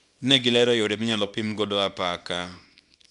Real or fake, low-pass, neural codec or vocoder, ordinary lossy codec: fake; 10.8 kHz; codec, 24 kHz, 0.9 kbps, WavTokenizer, small release; none